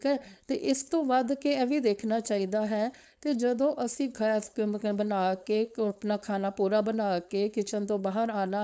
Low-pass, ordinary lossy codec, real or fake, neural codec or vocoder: none; none; fake; codec, 16 kHz, 4.8 kbps, FACodec